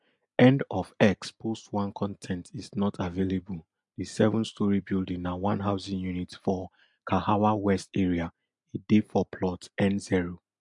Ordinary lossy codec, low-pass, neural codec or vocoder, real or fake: MP3, 64 kbps; 10.8 kHz; none; real